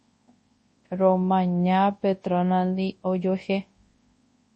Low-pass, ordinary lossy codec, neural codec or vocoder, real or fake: 10.8 kHz; MP3, 32 kbps; codec, 24 kHz, 0.9 kbps, WavTokenizer, large speech release; fake